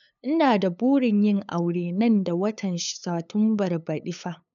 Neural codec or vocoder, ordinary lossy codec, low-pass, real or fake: codec, 16 kHz, 8 kbps, FunCodec, trained on LibriTTS, 25 frames a second; none; 7.2 kHz; fake